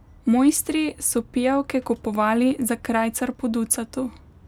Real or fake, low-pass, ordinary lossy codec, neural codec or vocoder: real; 19.8 kHz; none; none